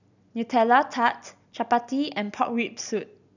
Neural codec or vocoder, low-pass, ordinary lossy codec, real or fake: none; 7.2 kHz; none; real